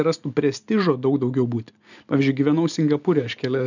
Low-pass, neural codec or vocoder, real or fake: 7.2 kHz; none; real